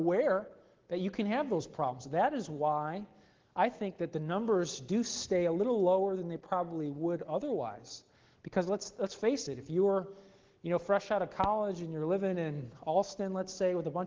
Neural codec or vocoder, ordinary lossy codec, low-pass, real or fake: none; Opus, 16 kbps; 7.2 kHz; real